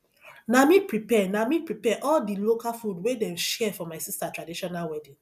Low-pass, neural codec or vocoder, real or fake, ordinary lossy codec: 14.4 kHz; none; real; none